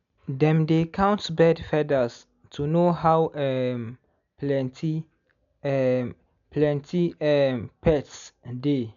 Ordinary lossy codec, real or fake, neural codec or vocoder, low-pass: none; real; none; 7.2 kHz